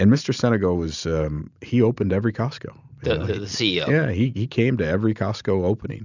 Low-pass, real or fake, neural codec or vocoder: 7.2 kHz; real; none